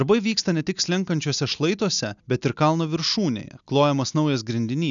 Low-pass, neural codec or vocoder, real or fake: 7.2 kHz; none; real